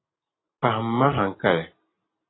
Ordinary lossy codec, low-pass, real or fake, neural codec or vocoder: AAC, 16 kbps; 7.2 kHz; real; none